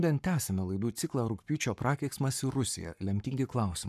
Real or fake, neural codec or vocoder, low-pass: fake; codec, 44.1 kHz, 7.8 kbps, Pupu-Codec; 14.4 kHz